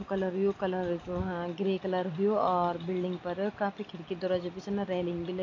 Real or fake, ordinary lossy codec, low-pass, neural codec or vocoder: real; AAC, 32 kbps; 7.2 kHz; none